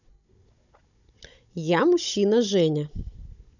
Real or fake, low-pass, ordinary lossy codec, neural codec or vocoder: fake; 7.2 kHz; none; codec, 16 kHz, 16 kbps, FunCodec, trained on Chinese and English, 50 frames a second